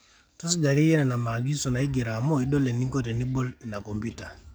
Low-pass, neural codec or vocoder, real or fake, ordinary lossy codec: none; codec, 44.1 kHz, 7.8 kbps, DAC; fake; none